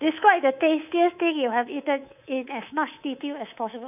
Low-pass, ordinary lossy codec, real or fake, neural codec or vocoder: 3.6 kHz; none; fake; codec, 24 kHz, 3.1 kbps, DualCodec